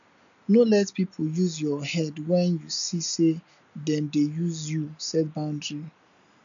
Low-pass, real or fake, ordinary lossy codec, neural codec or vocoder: 7.2 kHz; real; none; none